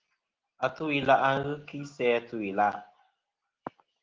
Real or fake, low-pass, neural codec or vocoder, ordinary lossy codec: real; 7.2 kHz; none; Opus, 16 kbps